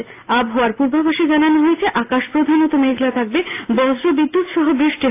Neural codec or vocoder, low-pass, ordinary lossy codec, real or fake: none; 3.6 kHz; AAC, 24 kbps; real